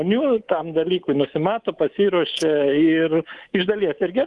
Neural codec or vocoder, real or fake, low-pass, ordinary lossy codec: codec, 24 kHz, 3.1 kbps, DualCodec; fake; 10.8 kHz; Opus, 24 kbps